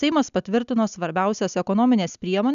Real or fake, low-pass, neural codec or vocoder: real; 7.2 kHz; none